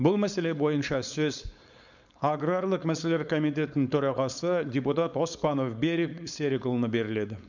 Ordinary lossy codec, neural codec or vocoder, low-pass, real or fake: none; codec, 16 kHz, 4.8 kbps, FACodec; 7.2 kHz; fake